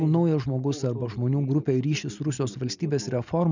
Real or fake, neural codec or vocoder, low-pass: real; none; 7.2 kHz